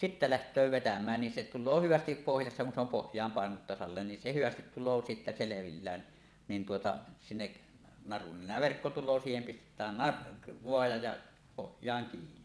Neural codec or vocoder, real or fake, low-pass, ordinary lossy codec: vocoder, 22.05 kHz, 80 mel bands, WaveNeXt; fake; none; none